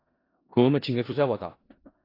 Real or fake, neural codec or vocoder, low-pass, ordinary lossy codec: fake; codec, 16 kHz in and 24 kHz out, 0.4 kbps, LongCat-Audio-Codec, four codebook decoder; 5.4 kHz; AAC, 24 kbps